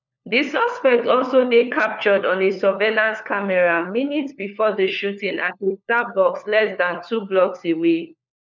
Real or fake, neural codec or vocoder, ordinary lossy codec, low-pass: fake; codec, 16 kHz, 4 kbps, FunCodec, trained on LibriTTS, 50 frames a second; none; 7.2 kHz